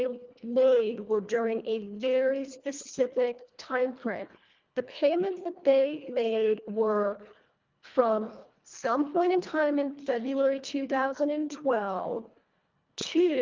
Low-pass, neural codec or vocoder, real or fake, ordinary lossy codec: 7.2 kHz; codec, 24 kHz, 1.5 kbps, HILCodec; fake; Opus, 24 kbps